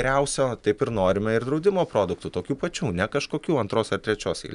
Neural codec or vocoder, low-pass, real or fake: none; 10.8 kHz; real